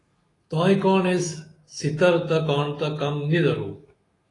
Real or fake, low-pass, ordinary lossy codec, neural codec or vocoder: fake; 10.8 kHz; AAC, 32 kbps; autoencoder, 48 kHz, 128 numbers a frame, DAC-VAE, trained on Japanese speech